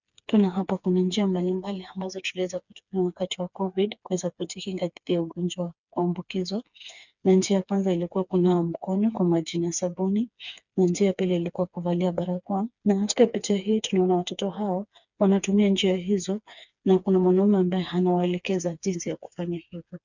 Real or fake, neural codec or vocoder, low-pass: fake; codec, 16 kHz, 4 kbps, FreqCodec, smaller model; 7.2 kHz